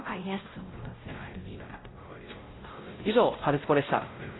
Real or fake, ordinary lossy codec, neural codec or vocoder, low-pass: fake; AAC, 16 kbps; codec, 16 kHz, 0.5 kbps, X-Codec, WavLM features, trained on Multilingual LibriSpeech; 7.2 kHz